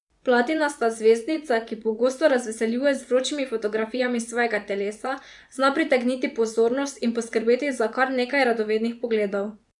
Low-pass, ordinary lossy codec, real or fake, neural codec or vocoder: 10.8 kHz; none; fake; vocoder, 24 kHz, 100 mel bands, Vocos